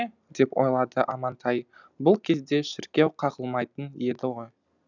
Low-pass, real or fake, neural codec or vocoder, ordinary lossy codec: 7.2 kHz; real; none; none